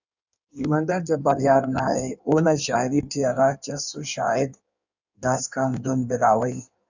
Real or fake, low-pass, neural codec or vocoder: fake; 7.2 kHz; codec, 16 kHz in and 24 kHz out, 1.1 kbps, FireRedTTS-2 codec